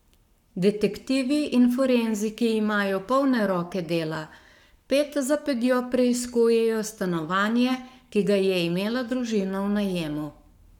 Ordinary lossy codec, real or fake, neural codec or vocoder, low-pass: none; fake; codec, 44.1 kHz, 7.8 kbps, Pupu-Codec; 19.8 kHz